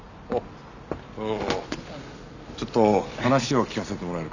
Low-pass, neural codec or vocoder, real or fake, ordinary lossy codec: 7.2 kHz; none; real; none